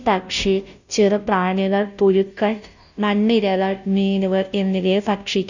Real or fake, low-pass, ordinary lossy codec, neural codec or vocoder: fake; 7.2 kHz; none; codec, 16 kHz, 0.5 kbps, FunCodec, trained on Chinese and English, 25 frames a second